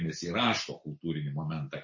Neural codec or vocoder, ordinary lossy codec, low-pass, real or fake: none; MP3, 32 kbps; 7.2 kHz; real